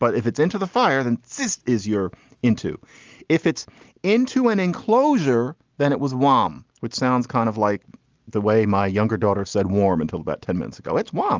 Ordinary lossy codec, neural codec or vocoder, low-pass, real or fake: Opus, 32 kbps; none; 7.2 kHz; real